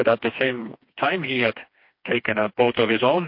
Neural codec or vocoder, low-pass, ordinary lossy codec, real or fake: codec, 16 kHz, 2 kbps, FreqCodec, smaller model; 5.4 kHz; MP3, 32 kbps; fake